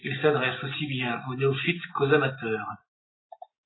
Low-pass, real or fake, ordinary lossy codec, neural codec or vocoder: 7.2 kHz; real; AAC, 16 kbps; none